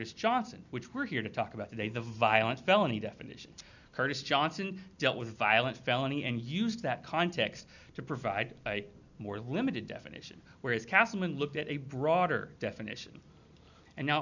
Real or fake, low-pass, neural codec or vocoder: real; 7.2 kHz; none